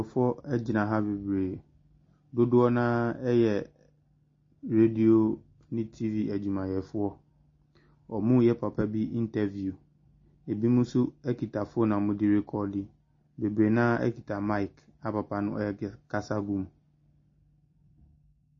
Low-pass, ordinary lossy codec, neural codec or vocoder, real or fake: 7.2 kHz; MP3, 32 kbps; none; real